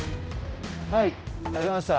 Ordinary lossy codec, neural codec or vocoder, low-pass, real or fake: none; codec, 16 kHz, 0.5 kbps, X-Codec, HuBERT features, trained on general audio; none; fake